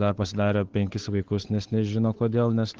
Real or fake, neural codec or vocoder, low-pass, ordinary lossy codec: fake; codec, 16 kHz, 8 kbps, FunCodec, trained on Chinese and English, 25 frames a second; 7.2 kHz; Opus, 16 kbps